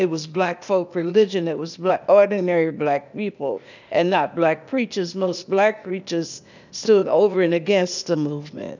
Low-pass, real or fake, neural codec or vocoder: 7.2 kHz; fake; codec, 16 kHz, 0.8 kbps, ZipCodec